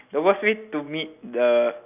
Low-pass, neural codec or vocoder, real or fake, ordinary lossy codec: 3.6 kHz; none; real; none